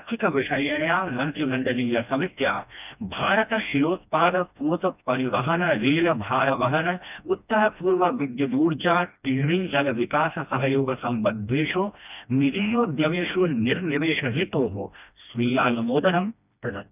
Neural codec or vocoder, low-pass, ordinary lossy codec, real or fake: codec, 16 kHz, 1 kbps, FreqCodec, smaller model; 3.6 kHz; none; fake